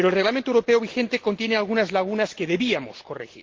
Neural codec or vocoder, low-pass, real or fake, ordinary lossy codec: none; 7.2 kHz; real; Opus, 16 kbps